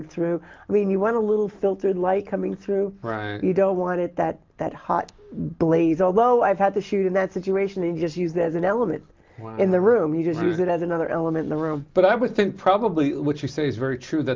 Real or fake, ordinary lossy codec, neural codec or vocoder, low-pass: real; Opus, 24 kbps; none; 7.2 kHz